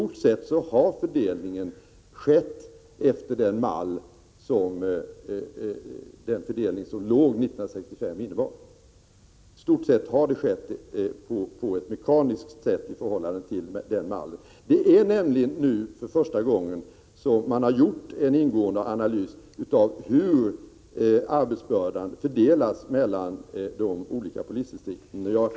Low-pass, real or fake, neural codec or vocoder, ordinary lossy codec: none; real; none; none